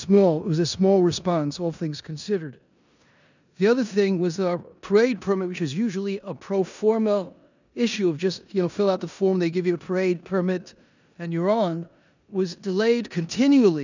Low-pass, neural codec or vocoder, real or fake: 7.2 kHz; codec, 16 kHz in and 24 kHz out, 0.9 kbps, LongCat-Audio-Codec, four codebook decoder; fake